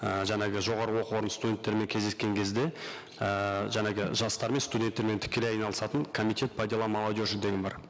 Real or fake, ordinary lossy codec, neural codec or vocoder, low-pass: real; none; none; none